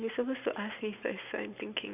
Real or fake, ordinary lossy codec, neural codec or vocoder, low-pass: real; none; none; 3.6 kHz